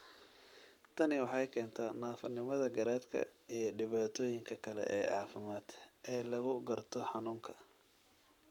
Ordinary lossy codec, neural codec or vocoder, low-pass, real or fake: none; codec, 44.1 kHz, 7.8 kbps, Pupu-Codec; 19.8 kHz; fake